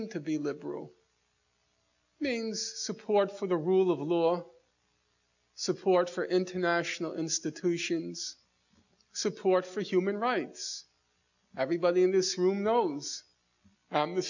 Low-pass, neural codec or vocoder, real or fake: 7.2 kHz; none; real